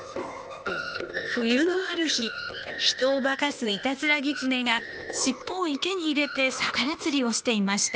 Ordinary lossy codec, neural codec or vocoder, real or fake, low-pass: none; codec, 16 kHz, 0.8 kbps, ZipCodec; fake; none